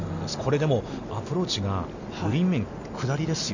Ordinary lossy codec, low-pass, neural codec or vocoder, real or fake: none; 7.2 kHz; none; real